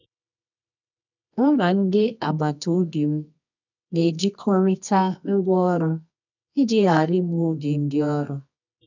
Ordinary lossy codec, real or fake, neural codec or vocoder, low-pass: none; fake; codec, 24 kHz, 0.9 kbps, WavTokenizer, medium music audio release; 7.2 kHz